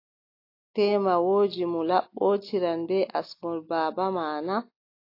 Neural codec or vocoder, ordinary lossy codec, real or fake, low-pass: none; AAC, 32 kbps; real; 5.4 kHz